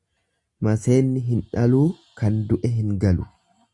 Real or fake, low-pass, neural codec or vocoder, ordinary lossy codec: real; 10.8 kHz; none; Opus, 64 kbps